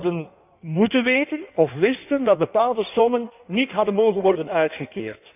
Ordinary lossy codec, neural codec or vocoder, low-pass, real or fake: none; codec, 16 kHz in and 24 kHz out, 1.1 kbps, FireRedTTS-2 codec; 3.6 kHz; fake